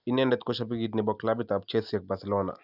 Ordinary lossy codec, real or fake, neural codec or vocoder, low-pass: none; real; none; 5.4 kHz